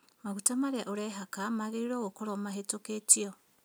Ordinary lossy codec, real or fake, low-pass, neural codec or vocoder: none; real; none; none